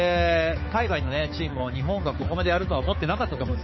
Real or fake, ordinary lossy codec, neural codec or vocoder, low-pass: fake; MP3, 24 kbps; codec, 16 kHz, 4 kbps, X-Codec, HuBERT features, trained on balanced general audio; 7.2 kHz